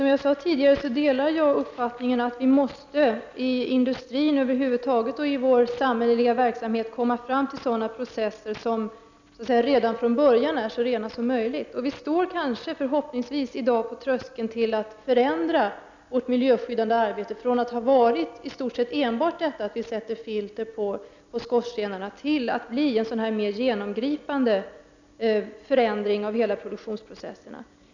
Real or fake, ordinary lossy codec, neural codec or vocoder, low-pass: real; none; none; 7.2 kHz